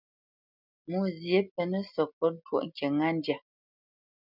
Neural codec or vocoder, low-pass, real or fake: none; 5.4 kHz; real